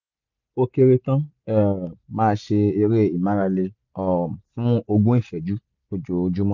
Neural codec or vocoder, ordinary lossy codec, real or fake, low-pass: none; none; real; 7.2 kHz